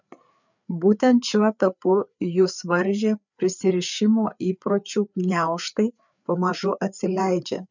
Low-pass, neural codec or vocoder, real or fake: 7.2 kHz; codec, 16 kHz, 4 kbps, FreqCodec, larger model; fake